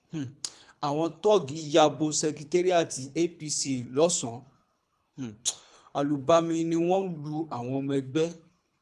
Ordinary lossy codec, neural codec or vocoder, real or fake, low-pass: none; codec, 24 kHz, 3 kbps, HILCodec; fake; none